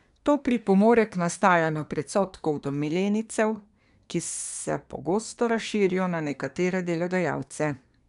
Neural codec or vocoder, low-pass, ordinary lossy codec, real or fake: codec, 24 kHz, 1 kbps, SNAC; 10.8 kHz; none; fake